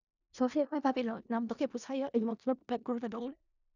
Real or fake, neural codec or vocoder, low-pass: fake; codec, 16 kHz in and 24 kHz out, 0.4 kbps, LongCat-Audio-Codec, four codebook decoder; 7.2 kHz